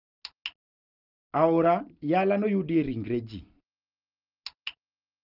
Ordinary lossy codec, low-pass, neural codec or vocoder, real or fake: Opus, 24 kbps; 5.4 kHz; none; real